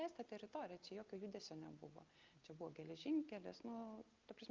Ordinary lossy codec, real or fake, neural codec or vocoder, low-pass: Opus, 24 kbps; real; none; 7.2 kHz